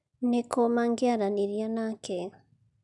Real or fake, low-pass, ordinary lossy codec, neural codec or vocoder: real; 10.8 kHz; none; none